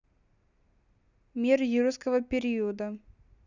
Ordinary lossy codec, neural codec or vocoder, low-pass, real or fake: none; none; 7.2 kHz; real